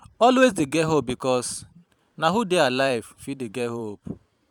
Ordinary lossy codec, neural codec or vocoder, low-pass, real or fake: none; vocoder, 44.1 kHz, 128 mel bands every 512 samples, BigVGAN v2; 19.8 kHz; fake